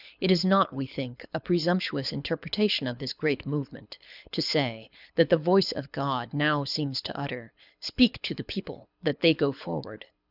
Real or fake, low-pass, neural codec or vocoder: fake; 5.4 kHz; codec, 24 kHz, 6 kbps, HILCodec